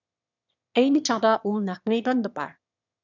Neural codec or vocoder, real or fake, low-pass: autoencoder, 22.05 kHz, a latent of 192 numbers a frame, VITS, trained on one speaker; fake; 7.2 kHz